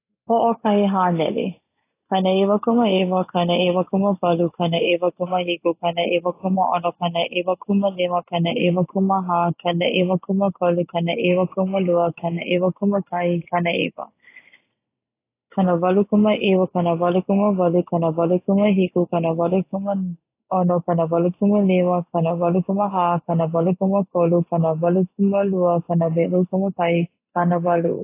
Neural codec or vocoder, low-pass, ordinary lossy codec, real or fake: none; 3.6 kHz; AAC, 24 kbps; real